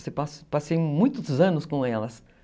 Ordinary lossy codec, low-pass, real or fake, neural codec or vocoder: none; none; real; none